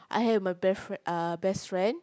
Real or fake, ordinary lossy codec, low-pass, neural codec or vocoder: real; none; none; none